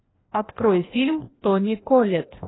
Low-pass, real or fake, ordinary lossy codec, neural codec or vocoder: 7.2 kHz; fake; AAC, 16 kbps; codec, 16 kHz, 1 kbps, FreqCodec, larger model